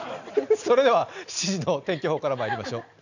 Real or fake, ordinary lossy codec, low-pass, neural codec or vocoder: real; none; 7.2 kHz; none